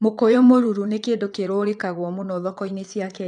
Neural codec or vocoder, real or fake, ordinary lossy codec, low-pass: vocoder, 22.05 kHz, 80 mel bands, WaveNeXt; fake; none; 9.9 kHz